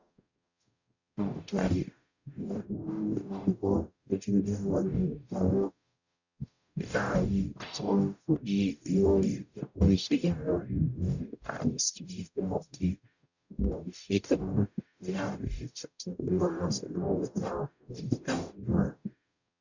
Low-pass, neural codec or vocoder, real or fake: 7.2 kHz; codec, 44.1 kHz, 0.9 kbps, DAC; fake